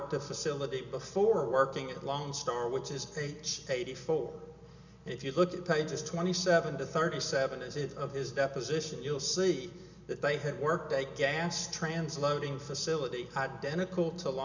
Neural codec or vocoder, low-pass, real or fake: none; 7.2 kHz; real